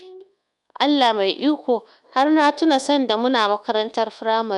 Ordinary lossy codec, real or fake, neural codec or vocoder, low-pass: none; fake; codec, 24 kHz, 1.2 kbps, DualCodec; 10.8 kHz